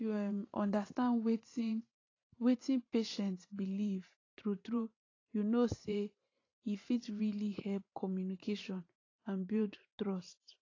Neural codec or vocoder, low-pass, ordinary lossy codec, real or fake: vocoder, 44.1 kHz, 128 mel bands every 512 samples, BigVGAN v2; 7.2 kHz; AAC, 32 kbps; fake